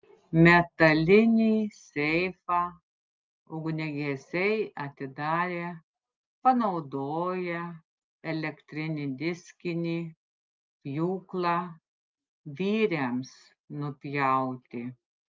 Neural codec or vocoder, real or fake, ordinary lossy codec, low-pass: none; real; Opus, 24 kbps; 7.2 kHz